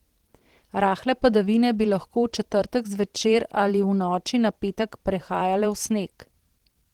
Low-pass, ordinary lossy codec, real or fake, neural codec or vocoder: 19.8 kHz; Opus, 16 kbps; fake; vocoder, 44.1 kHz, 128 mel bands every 512 samples, BigVGAN v2